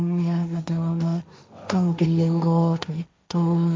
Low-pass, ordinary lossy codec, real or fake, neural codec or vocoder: none; none; fake; codec, 16 kHz, 1.1 kbps, Voila-Tokenizer